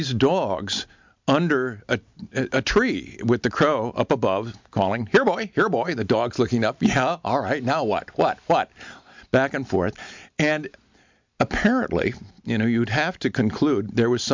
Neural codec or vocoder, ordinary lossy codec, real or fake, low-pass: none; MP3, 64 kbps; real; 7.2 kHz